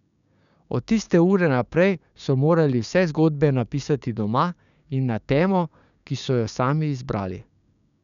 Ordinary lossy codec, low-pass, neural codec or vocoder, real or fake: none; 7.2 kHz; codec, 16 kHz, 6 kbps, DAC; fake